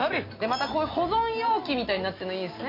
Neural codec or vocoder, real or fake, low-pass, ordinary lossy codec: none; real; 5.4 kHz; MP3, 32 kbps